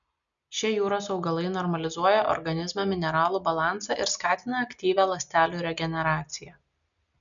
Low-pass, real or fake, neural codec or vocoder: 7.2 kHz; real; none